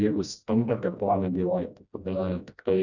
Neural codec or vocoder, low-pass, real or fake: codec, 16 kHz, 1 kbps, FreqCodec, smaller model; 7.2 kHz; fake